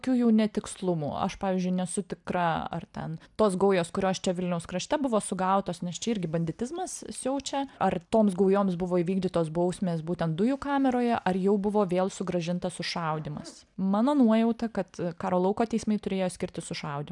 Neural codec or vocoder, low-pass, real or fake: none; 10.8 kHz; real